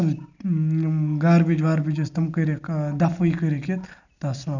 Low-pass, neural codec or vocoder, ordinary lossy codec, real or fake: 7.2 kHz; none; none; real